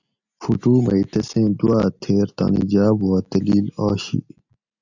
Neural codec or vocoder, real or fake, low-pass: none; real; 7.2 kHz